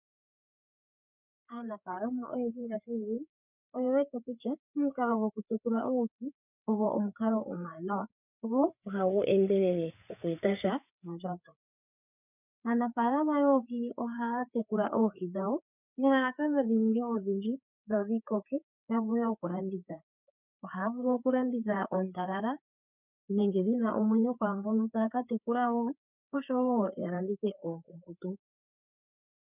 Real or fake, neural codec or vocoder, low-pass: fake; codec, 16 kHz, 4 kbps, FreqCodec, larger model; 3.6 kHz